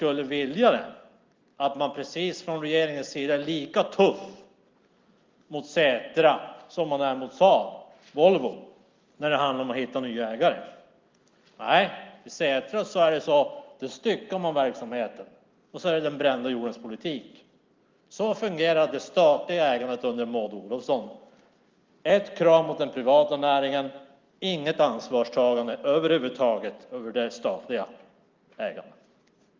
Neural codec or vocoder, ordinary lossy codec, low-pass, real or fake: none; Opus, 24 kbps; 7.2 kHz; real